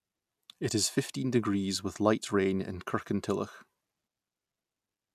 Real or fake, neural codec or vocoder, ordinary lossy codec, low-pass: real; none; none; 14.4 kHz